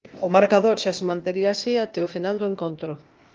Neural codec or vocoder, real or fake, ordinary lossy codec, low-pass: codec, 16 kHz, 0.8 kbps, ZipCodec; fake; Opus, 24 kbps; 7.2 kHz